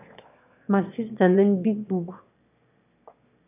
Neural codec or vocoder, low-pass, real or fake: autoencoder, 22.05 kHz, a latent of 192 numbers a frame, VITS, trained on one speaker; 3.6 kHz; fake